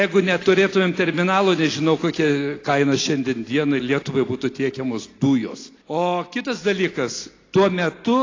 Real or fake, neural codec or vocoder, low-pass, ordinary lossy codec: real; none; 7.2 kHz; AAC, 32 kbps